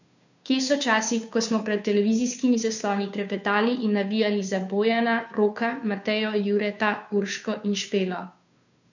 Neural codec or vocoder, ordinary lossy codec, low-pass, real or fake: codec, 16 kHz, 2 kbps, FunCodec, trained on Chinese and English, 25 frames a second; none; 7.2 kHz; fake